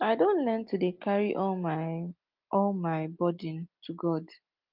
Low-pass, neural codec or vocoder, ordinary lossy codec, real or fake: 5.4 kHz; none; Opus, 32 kbps; real